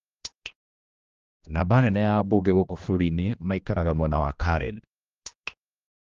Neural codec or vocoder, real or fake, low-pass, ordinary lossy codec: codec, 16 kHz, 1 kbps, X-Codec, HuBERT features, trained on general audio; fake; 7.2 kHz; Opus, 32 kbps